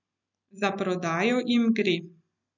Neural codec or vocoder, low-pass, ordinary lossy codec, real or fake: none; 7.2 kHz; none; real